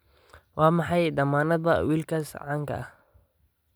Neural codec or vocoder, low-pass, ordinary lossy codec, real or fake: none; none; none; real